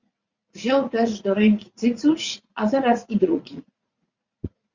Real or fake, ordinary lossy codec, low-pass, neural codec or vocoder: fake; Opus, 64 kbps; 7.2 kHz; vocoder, 44.1 kHz, 80 mel bands, Vocos